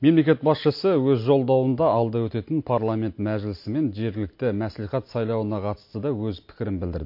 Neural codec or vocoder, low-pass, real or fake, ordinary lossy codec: none; 5.4 kHz; real; MP3, 32 kbps